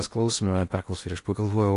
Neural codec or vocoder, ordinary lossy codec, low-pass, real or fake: codec, 16 kHz in and 24 kHz out, 0.8 kbps, FocalCodec, streaming, 65536 codes; AAC, 48 kbps; 10.8 kHz; fake